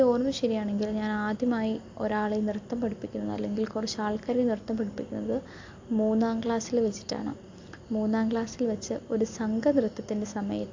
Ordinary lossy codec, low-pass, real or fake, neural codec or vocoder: AAC, 48 kbps; 7.2 kHz; real; none